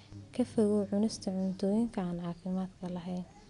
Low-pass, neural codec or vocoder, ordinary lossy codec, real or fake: 10.8 kHz; none; none; real